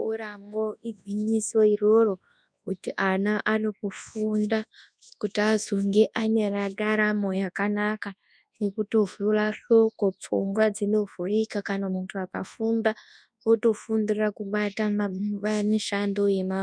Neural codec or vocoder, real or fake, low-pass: codec, 24 kHz, 0.9 kbps, WavTokenizer, large speech release; fake; 9.9 kHz